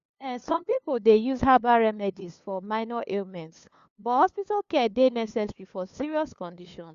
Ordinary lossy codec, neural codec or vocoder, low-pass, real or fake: Opus, 64 kbps; codec, 16 kHz, 2 kbps, FunCodec, trained on LibriTTS, 25 frames a second; 7.2 kHz; fake